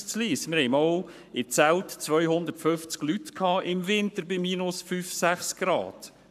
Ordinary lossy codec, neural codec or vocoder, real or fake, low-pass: none; codec, 44.1 kHz, 7.8 kbps, Pupu-Codec; fake; 14.4 kHz